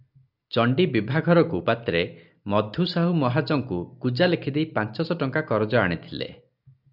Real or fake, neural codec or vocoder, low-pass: real; none; 5.4 kHz